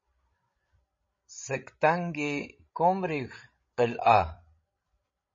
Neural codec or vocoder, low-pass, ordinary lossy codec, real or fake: codec, 16 kHz, 16 kbps, FreqCodec, larger model; 7.2 kHz; MP3, 32 kbps; fake